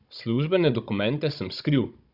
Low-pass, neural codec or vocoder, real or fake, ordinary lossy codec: 5.4 kHz; codec, 16 kHz, 16 kbps, FunCodec, trained on Chinese and English, 50 frames a second; fake; none